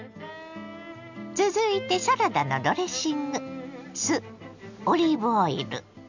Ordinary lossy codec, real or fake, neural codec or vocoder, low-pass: none; real; none; 7.2 kHz